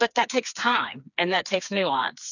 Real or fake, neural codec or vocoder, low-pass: fake; codec, 16 kHz, 4 kbps, FreqCodec, smaller model; 7.2 kHz